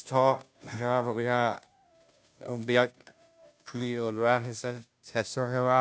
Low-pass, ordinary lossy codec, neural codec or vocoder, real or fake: none; none; codec, 16 kHz, 0.5 kbps, FunCodec, trained on Chinese and English, 25 frames a second; fake